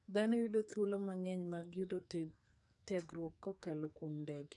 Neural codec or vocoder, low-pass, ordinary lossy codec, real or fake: codec, 44.1 kHz, 2.6 kbps, SNAC; 10.8 kHz; none; fake